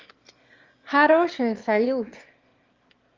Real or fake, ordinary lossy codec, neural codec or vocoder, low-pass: fake; Opus, 32 kbps; autoencoder, 22.05 kHz, a latent of 192 numbers a frame, VITS, trained on one speaker; 7.2 kHz